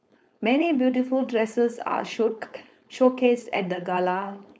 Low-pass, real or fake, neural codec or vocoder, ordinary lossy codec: none; fake; codec, 16 kHz, 4.8 kbps, FACodec; none